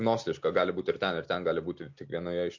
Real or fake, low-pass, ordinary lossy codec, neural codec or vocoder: real; 7.2 kHz; MP3, 48 kbps; none